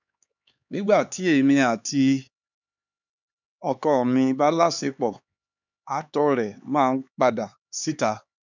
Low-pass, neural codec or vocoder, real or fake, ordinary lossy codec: 7.2 kHz; codec, 16 kHz, 4 kbps, X-Codec, HuBERT features, trained on LibriSpeech; fake; none